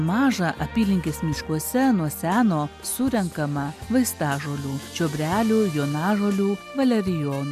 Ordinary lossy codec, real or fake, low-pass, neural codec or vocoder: MP3, 96 kbps; real; 14.4 kHz; none